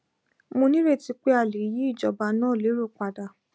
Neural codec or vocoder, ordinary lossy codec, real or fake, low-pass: none; none; real; none